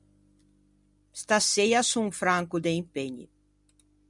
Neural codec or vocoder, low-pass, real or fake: none; 10.8 kHz; real